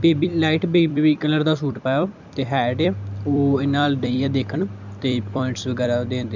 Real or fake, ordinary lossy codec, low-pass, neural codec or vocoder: fake; none; 7.2 kHz; vocoder, 44.1 kHz, 128 mel bands every 256 samples, BigVGAN v2